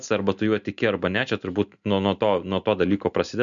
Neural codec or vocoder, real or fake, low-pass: none; real; 7.2 kHz